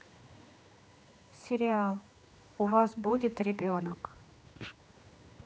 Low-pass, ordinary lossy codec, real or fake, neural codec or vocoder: none; none; fake; codec, 16 kHz, 2 kbps, X-Codec, HuBERT features, trained on general audio